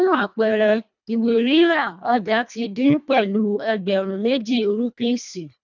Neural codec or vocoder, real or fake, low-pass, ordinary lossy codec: codec, 24 kHz, 1.5 kbps, HILCodec; fake; 7.2 kHz; none